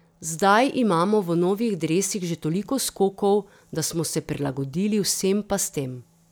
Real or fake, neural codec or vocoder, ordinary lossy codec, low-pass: real; none; none; none